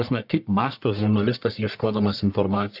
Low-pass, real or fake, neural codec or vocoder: 5.4 kHz; fake; codec, 44.1 kHz, 1.7 kbps, Pupu-Codec